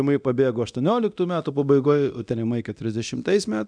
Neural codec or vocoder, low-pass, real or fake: codec, 24 kHz, 0.9 kbps, DualCodec; 9.9 kHz; fake